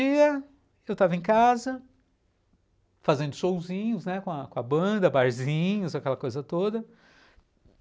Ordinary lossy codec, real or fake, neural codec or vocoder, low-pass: none; real; none; none